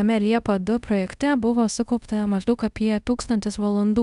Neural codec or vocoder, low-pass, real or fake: codec, 24 kHz, 0.5 kbps, DualCodec; 10.8 kHz; fake